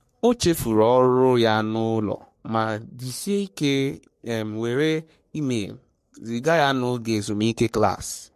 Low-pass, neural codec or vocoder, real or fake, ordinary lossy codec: 14.4 kHz; codec, 44.1 kHz, 3.4 kbps, Pupu-Codec; fake; MP3, 64 kbps